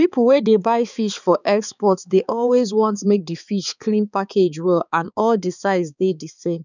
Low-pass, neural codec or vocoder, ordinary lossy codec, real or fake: 7.2 kHz; codec, 16 kHz, 4 kbps, X-Codec, HuBERT features, trained on balanced general audio; none; fake